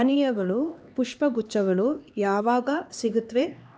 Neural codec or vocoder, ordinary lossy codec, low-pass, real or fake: codec, 16 kHz, 2 kbps, X-Codec, HuBERT features, trained on LibriSpeech; none; none; fake